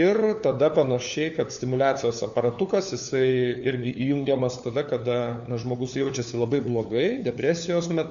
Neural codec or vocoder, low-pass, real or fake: codec, 16 kHz, 4 kbps, FunCodec, trained on LibriTTS, 50 frames a second; 7.2 kHz; fake